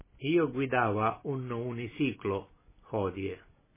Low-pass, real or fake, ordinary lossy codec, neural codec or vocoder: 3.6 kHz; real; MP3, 16 kbps; none